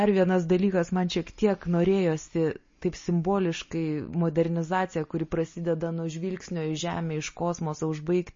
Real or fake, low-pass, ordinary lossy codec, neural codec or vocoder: real; 7.2 kHz; MP3, 32 kbps; none